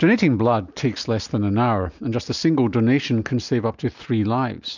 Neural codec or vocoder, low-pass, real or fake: none; 7.2 kHz; real